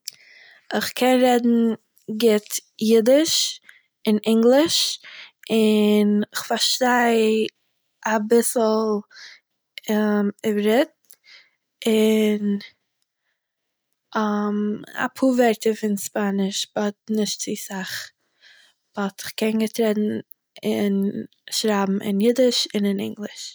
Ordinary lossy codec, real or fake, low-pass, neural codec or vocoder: none; real; none; none